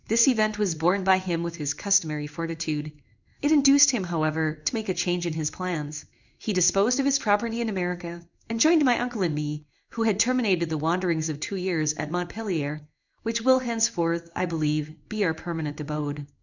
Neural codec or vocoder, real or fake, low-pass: codec, 16 kHz in and 24 kHz out, 1 kbps, XY-Tokenizer; fake; 7.2 kHz